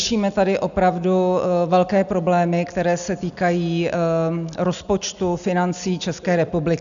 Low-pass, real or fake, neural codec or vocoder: 7.2 kHz; real; none